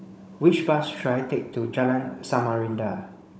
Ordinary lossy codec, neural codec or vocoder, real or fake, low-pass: none; codec, 16 kHz, 16 kbps, FunCodec, trained on Chinese and English, 50 frames a second; fake; none